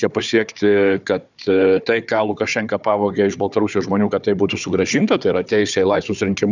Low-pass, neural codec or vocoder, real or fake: 7.2 kHz; codec, 16 kHz, 4 kbps, FunCodec, trained on Chinese and English, 50 frames a second; fake